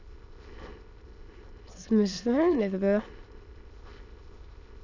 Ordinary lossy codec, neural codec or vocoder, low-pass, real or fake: none; autoencoder, 22.05 kHz, a latent of 192 numbers a frame, VITS, trained on many speakers; 7.2 kHz; fake